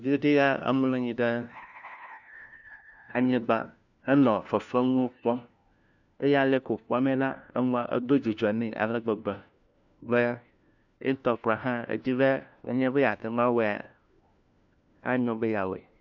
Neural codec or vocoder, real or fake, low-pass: codec, 16 kHz, 1 kbps, FunCodec, trained on LibriTTS, 50 frames a second; fake; 7.2 kHz